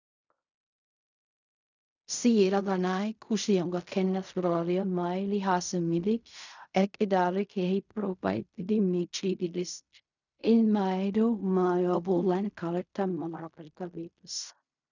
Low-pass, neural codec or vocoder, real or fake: 7.2 kHz; codec, 16 kHz in and 24 kHz out, 0.4 kbps, LongCat-Audio-Codec, fine tuned four codebook decoder; fake